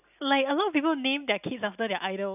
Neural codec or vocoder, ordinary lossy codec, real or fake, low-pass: none; none; real; 3.6 kHz